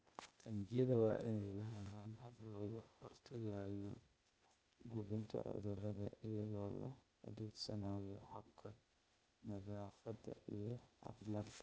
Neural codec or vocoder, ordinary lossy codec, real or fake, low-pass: codec, 16 kHz, 0.8 kbps, ZipCodec; none; fake; none